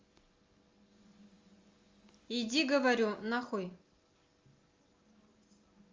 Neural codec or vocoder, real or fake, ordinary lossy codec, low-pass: none; real; Opus, 32 kbps; 7.2 kHz